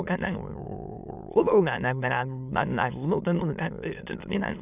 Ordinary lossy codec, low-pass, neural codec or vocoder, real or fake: none; 3.6 kHz; autoencoder, 22.05 kHz, a latent of 192 numbers a frame, VITS, trained on many speakers; fake